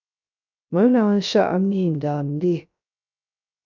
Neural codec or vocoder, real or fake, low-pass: codec, 16 kHz, 0.3 kbps, FocalCodec; fake; 7.2 kHz